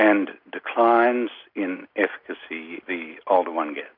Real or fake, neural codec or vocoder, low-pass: real; none; 5.4 kHz